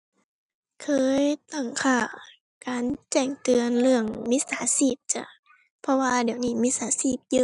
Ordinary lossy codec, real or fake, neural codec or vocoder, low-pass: none; real; none; 10.8 kHz